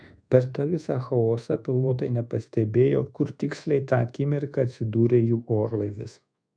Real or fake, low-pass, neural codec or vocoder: fake; 9.9 kHz; codec, 24 kHz, 1.2 kbps, DualCodec